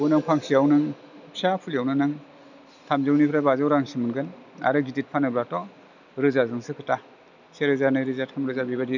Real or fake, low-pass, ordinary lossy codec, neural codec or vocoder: real; 7.2 kHz; none; none